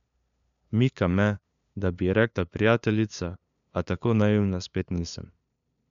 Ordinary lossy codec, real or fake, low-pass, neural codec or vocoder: none; fake; 7.2 kHz; codec, 16 kHz, 2 kbps, FunCodec, trained on LibriTTS, 25 frames a second